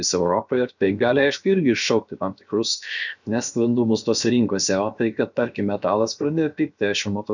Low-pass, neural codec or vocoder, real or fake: 7.2 kHz; codec, 16 kHz, 0.7 kbps, FocalCodec; fake